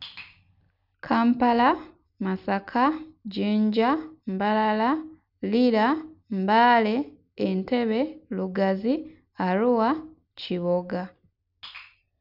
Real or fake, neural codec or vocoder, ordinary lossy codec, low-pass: real; none; none; 5.4 kHz